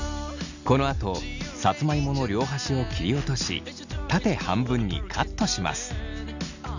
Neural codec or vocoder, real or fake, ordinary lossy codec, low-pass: none; real; none; 7.2 kHz